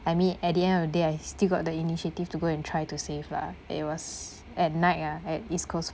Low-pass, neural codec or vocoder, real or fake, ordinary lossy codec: none; none; real; none